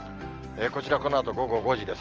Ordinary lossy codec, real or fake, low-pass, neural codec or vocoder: Opus, 24 kbps; real; 7.2 kHz; none